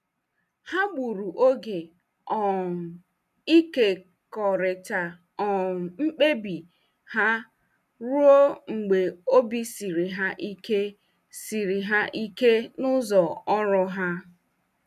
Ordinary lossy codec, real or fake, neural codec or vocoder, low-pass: none; real; none; 14.4 kHz